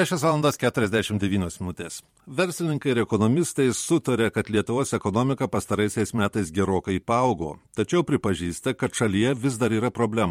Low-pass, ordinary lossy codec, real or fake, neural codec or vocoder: 19.8 kHz; MP3, 64 kbps; fake; vocoder, 44.1 kHz, 128 mel bands every 512 samples, BigVGAN v2